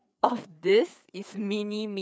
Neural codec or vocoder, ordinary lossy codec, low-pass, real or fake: codec, 16 kHz, 4 kbps, FreqCodec, larger model; none; none; fake